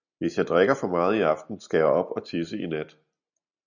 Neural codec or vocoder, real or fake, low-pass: none; real; 7.2 kHz